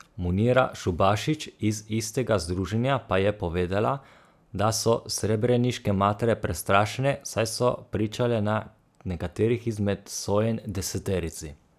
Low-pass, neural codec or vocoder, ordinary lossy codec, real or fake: 14.4 kHz; none; none; real